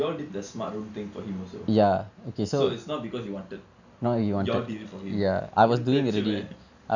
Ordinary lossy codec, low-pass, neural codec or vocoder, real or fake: none; 7.2 kHz; none; real